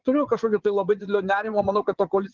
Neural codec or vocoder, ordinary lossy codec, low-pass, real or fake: vocoder, 22.05 kHz, 80 mel bands, Vocos; Opus, 16 kbps; 7.2 kHz; fake